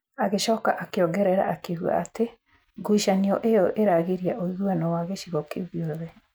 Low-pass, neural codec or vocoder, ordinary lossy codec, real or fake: none; none; none; real